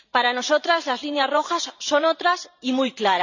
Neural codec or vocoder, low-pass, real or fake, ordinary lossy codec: vocoder, 44.1 kHz, 128 mel bands every 512 samples, BigVGAN v2; 7.2 kHz; fake; none